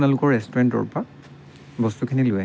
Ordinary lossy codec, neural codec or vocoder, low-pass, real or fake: none; none; none; real